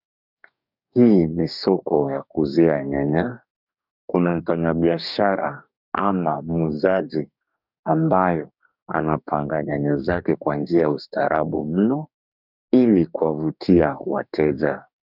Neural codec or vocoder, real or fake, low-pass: codec, 44.1 kHz, 2.6 kbps, DAC; fake; 5.4 kHz